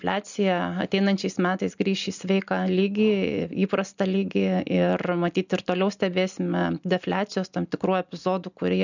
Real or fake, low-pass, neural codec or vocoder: real; 7.2 kHz; none